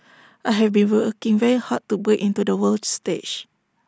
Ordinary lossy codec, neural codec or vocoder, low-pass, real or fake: none; none; none; real